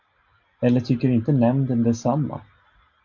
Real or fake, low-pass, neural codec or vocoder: real; 7.2 kHz; none